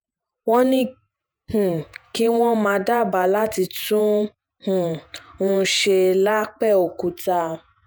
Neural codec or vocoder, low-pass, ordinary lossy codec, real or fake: vocoder, 48 kHz, 128 mel bands, Vocos; none; none; fake